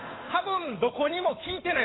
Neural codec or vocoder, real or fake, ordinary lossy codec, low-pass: codec, 16 kHz, 8 kbps, FunCodec, trained on Chinese and English, 25 frames a second; fake; AAC, 16 kbps; 7.2 kHz